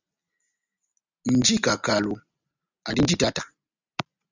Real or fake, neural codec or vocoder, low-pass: real; none; 7.2 kHz